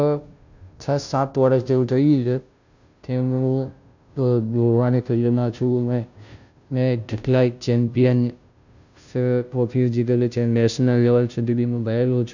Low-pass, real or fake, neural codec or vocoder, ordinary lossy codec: 7.2 kHz; fake; codec, 16 kHz, 0.5 kbps, FunCodec, trained on Chinese and English, 25 frames a second; none